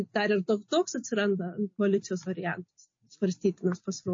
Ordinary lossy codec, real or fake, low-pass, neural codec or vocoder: MP3, 32 kbps; real; 7.2 kHz; none